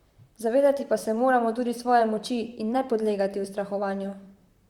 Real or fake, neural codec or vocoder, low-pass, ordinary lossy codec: fake; vocoder, 44.1 kHz, 128 mel bands, Pupu-Vocoder; 19.8 kHz; Opus, 64 kbps